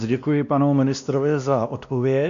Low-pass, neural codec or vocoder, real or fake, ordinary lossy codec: 7.2 kHz; codec, 16 kHz, 1 kbps, X-Codec, WavLM features, trained on Multilingual LibriSpeech; fake; MP3, 96 kbps